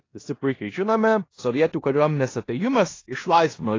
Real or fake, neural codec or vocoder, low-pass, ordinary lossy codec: fake; codec, 16 kHz in and 24 kHz out, 0.9 kbps, LongCat-Audio-Codec, four codebook decoder; 7.2 kHz; AAC, 32 kbps